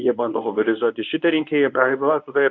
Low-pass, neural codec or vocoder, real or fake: 7.2 kHz; codec, 24 kHz, 0.9 kbps, WavTokenizer, medium speech release version 1; fake